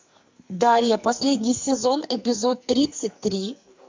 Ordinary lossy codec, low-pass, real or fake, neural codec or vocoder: MP3, 64 kbps; 7.2 kHz; fake; codec, 44.1 kHz, 2.6 kbps, SNAC